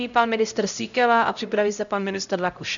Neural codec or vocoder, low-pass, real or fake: codec, 16 kHz, 0.5 kbps, X-Codec, HuBERT features, trained on LibriSpeech; 7.2 kHz; fake